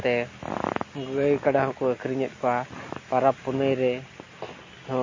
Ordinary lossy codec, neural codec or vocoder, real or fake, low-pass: MP3, 32 kbps; none; real; 7.2 kHz